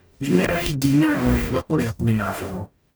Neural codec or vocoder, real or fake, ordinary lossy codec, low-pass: codec, 44.1 kHz, 0.9 kbps, DAC; fake; none; none